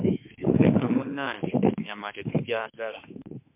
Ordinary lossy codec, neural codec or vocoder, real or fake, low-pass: MP3, 32 kbps; autoencoder, 48 kHz, 32 numbers a frame, DAC-VAE, trained on Japanese speech; fake; 3.6 kHz